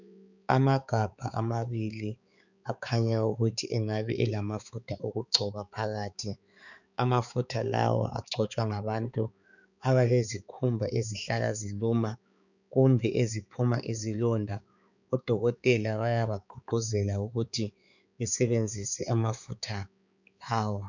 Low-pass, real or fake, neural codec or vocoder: 7.2 kHz; fake; codec, 16 kHz, 4 kbps, X-Codec, HuBERT features, trained on balanced general audio